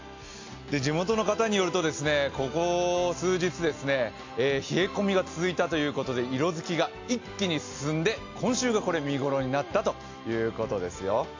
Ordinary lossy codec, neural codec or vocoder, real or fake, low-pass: AAC, 48 kbps; none; real; 7.2 kHz